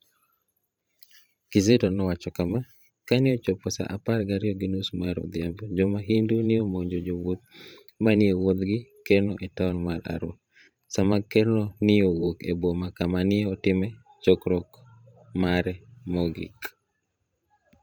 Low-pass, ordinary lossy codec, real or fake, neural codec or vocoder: none; none; fake; vocoder, 44.1 kHz, 128 mel bands every 512 samples, BigVGAN v2